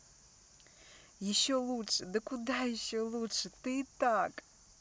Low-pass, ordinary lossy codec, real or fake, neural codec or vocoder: none; none; real; none